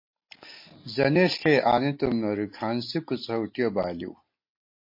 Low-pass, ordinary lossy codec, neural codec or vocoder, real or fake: 5.4 kHz; MP3, 32 kbps; none; real